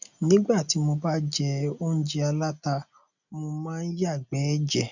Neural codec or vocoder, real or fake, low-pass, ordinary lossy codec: none; real; 7.2 kHz; none